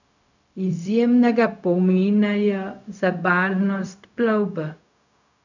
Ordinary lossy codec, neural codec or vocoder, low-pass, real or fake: none; codec, 16 kHz, 0.4 kbps, LongCat-Audio-Codec; 7.2 kHz; fake